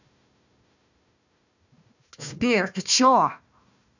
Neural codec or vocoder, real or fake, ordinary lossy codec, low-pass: codec, 16 kHz, 1 kbps, FunCodec, trained on Chinese and English, 50 frames a second; fake; none; 7.2 kHz